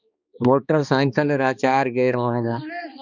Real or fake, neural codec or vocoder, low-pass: fake; codec, 16 kHz, 2 kbps, X-Codec, HuBERT features, trained on balanced general audio; 7.2 kHz